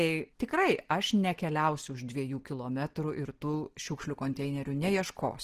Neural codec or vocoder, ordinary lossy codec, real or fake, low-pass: none; Opus, 16 kbps; real; 14.4 kHz